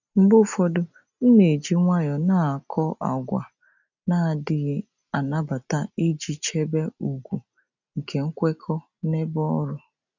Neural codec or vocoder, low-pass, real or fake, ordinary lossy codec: none; 7.2 kHz; real; none